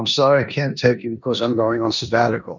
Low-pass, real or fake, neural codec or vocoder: 7.2 kHz; fake; codec, 16 kHz in and 24 kHz out, 0.9 kbps, LongCat-Audio-Codec, four codebook decoder